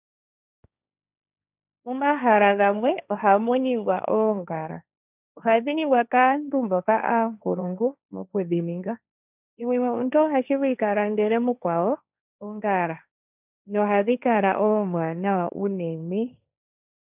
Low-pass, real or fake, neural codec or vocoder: 3.6 kHz; fake; codec, 16 kHz, 1.1 kbps, Voila-Tokenizer